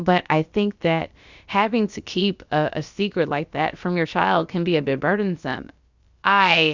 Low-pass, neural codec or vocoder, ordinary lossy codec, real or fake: 7.2 kHz; codec, 16 kHz, about 1 kbps, DyCAST, with the encoder's durations; Opus, 64 kbps; fake